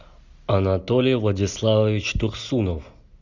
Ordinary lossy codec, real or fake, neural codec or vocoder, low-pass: Opus, 64 kbps; real; none; 7.2 kHz